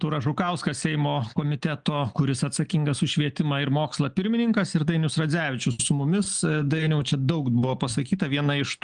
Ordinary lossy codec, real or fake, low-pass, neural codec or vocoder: Opus, 32 kbps; real; 9.9 kHz; none